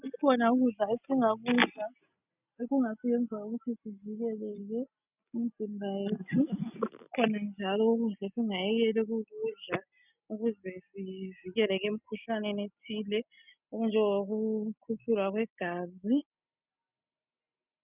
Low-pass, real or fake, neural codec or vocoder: 3.6 kHz; real; none